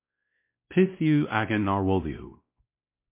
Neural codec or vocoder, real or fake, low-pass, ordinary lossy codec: codec, 16 kHz, 1 kbps, X-Codec, WavLM features, trained on Multilingual LibriSpeech; fake; 3.6 kHz; MP3, 24 kbps